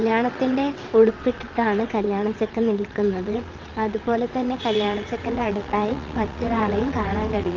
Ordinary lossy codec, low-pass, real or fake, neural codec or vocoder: Opus, 24 kbps; 7.2 kHz; fake; vocoder, 22.05 kHz, 80 mel bands, WaveNeXt